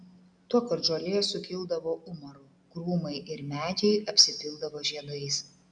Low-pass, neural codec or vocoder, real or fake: 9.9 kHz; none; real